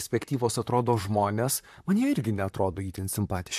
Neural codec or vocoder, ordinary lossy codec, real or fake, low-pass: vocoder, 44.1 kHz, 128 mel bands, Pupu-Vocoder; AAC, 96 kbps; fake; 14.4 kHz